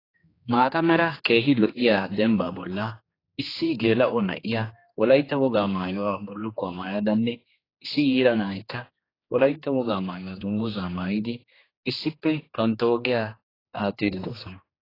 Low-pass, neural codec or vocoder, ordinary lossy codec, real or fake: 5.4 kHz; codec, 16 kHz, 2 kbps, X-Codec, HuBERT features, trained on general audio; AAC, 24 kbps; fake